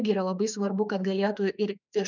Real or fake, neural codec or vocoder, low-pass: fake; autoencoder, 48 kHz, 32 numbers a frame, DAC-VAE, trained on Japanese speech; 7.2 kHz